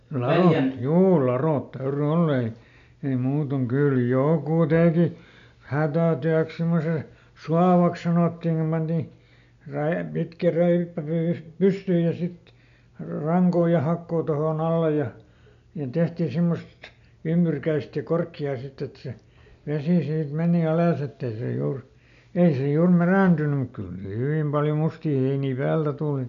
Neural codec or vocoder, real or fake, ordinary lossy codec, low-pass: none; real; none; 7.2 kHz